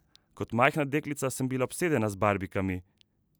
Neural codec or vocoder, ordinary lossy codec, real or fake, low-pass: none; none; real; none